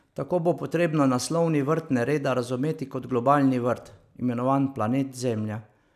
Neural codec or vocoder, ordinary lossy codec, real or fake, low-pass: none; none; real; 14.4 kHz